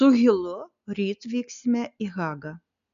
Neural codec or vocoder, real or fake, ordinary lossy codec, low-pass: none; real; MP3, 96 kbps; 7.2 kHz